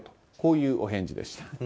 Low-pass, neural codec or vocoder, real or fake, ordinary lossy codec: none; none; real; none